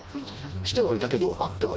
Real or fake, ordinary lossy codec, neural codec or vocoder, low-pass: fake; none; codec, 16 kHz, 1 kbps, FreqCodec, smaller model; none